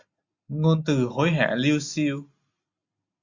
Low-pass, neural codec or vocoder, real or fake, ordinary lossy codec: 7.2 kHz; none; real; Opus, 64 kbps